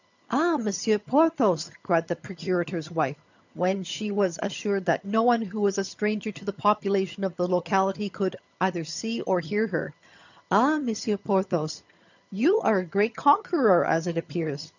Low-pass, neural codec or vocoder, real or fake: 7.2 kHz; vocoder, 22.05 kHz, 80 mel bands, HiFi-GAN; fake